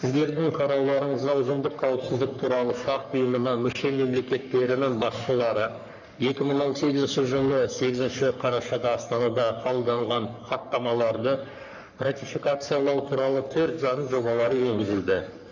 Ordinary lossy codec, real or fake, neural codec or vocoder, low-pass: none; fake; codec, 44.1 kHz, 3.4 kbps, Pupu-Codec; 7.2 kHz